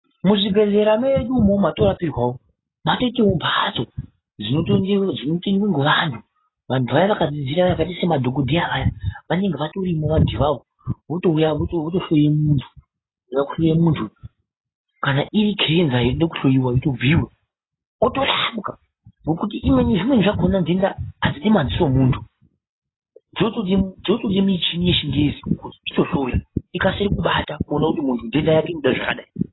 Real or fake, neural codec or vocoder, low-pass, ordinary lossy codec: real; none; 7.2 kHz; AAC, 16 kbps